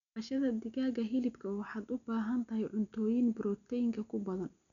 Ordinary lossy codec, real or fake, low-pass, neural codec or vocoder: MP3, 96 kbps; real; 7.2 kHz; none